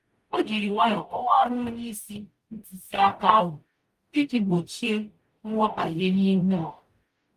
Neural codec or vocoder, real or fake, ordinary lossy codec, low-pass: codec, 44.1 kHz, 0.9 kbps, DAC; fake; Opus, 32 kbps; 14.4 kHz